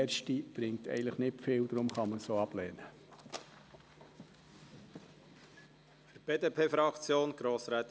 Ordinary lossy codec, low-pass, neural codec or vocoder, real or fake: none; none; none; real